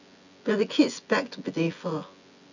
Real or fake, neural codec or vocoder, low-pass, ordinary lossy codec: fake; vocoder, 24 kHz, 100 mel bands, Vocos; 7.2 kHz; none